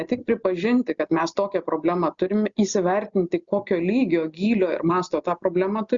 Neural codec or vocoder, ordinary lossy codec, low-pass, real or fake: none; Opus, 64 kbps; 7.2 kHz; real